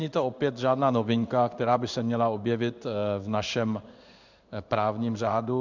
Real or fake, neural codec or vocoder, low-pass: fake; codec, 16 kHz in and 24 kHz out, 1 kbps, XY-Tokenizer; 7.2 kHz